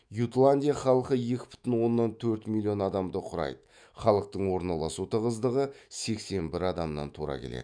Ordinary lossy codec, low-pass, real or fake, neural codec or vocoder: none; 9.9 kHz; fake; autoencoder, 48 kHz, 128 numbers a frame, DAC-VAE, trained on Japanese speech